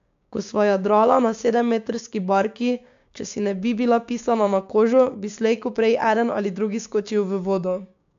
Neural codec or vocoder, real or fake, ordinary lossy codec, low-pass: codec, 16 kHz, 6 kbps, DAC; fake; AAC, 64 kbps; 7.2 kHz